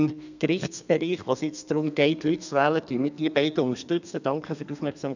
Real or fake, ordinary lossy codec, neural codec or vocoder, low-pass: fake; none; codec, 32 kHz, 1.9 kbps, SNAC; 7.2 kHz